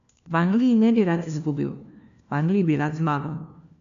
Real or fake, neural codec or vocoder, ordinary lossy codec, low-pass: fake; codec, 16 kHz, 1 kbps, FunCodec, trained on LibriTTS, 50 frames a second; MP3, 64 kbps; 7.2 kHz